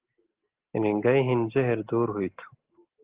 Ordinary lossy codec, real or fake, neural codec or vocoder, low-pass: Opus, 16 kbps; real; none; 3.6 kHz